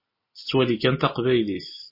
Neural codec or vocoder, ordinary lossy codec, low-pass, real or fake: none; MP3, 24 kbps; 5.4 kHz; real